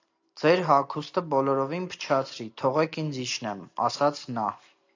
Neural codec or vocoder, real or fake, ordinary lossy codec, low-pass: none; real; AAC, 48 kbps; 7.2 kHz